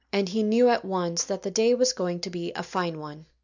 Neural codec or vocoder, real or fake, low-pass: none; real; 7.2 kHz